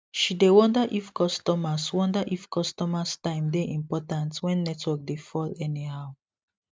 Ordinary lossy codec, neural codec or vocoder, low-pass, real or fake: none; none; none; real